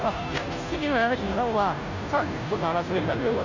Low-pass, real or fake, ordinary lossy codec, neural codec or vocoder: 7.2 kHz; fake; AAC, 48 kbps; codec, 16 kHz, 0.5 kbps, FunCodec, trained on Chinese and English, 25 frames a second